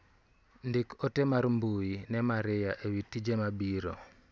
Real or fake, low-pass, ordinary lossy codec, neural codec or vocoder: real; none; none; none